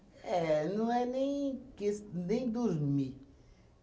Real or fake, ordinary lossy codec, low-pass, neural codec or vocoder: real; none; none; none